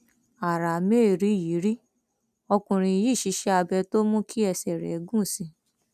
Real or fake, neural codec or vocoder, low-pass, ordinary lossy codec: real; none; 14.4 kHz; none